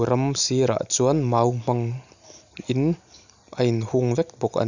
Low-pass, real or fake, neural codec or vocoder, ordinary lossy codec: 7.2 kHz; real; none; none